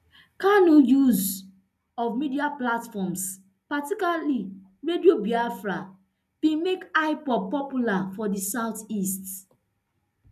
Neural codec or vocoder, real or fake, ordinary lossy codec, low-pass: none; real; none; 14.4 kHz